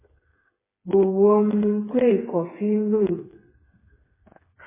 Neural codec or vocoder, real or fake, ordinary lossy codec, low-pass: codec, 16 kHz, 4 kbps, FreqCodec, smaller model; fake; MP3, 16 kbps; 3.6 kHz